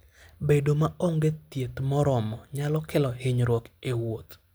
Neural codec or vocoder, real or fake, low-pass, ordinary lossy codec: none; real; none; none